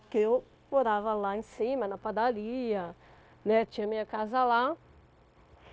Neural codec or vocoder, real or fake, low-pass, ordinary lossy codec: codec, 16 kHz, 0.9 kbps, LongCat-Audio-Codec; fake; none; none